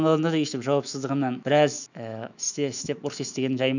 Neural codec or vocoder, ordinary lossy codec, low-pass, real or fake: autoencoder, 48 kHz, 128 numbers a frame, DAC-VAE, trained on Japanese speech; none; 7.2 kHz; fake